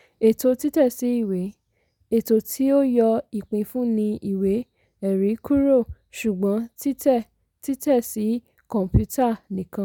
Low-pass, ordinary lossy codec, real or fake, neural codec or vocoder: 19.8 kHz; none; real; none